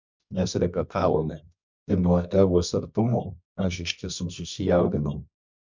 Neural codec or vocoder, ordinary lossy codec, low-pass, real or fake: codec, 24 kHz, 0.9 kbps, WavTokenizer, medium music audio release; MP3, 64 kbps; 7.2 kHz; fake